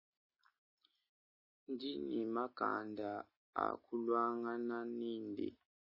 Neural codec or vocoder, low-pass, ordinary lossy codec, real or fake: none; 5.4 kHz; MP3, 24 kbps; real